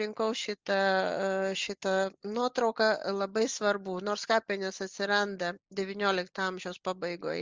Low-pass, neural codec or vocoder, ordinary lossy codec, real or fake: 7.2 kHz; none; Opus, 32 kbps; real